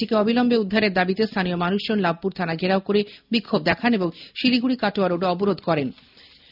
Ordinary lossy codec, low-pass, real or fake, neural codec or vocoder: none; 5.4 kHz; real; none